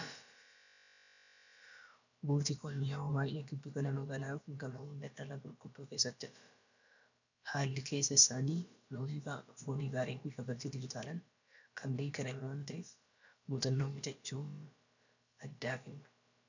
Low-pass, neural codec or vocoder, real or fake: 7.2 kHz; codec, 16 kHz, about 1 kbps, DyCAST, with the encoder's durations; fake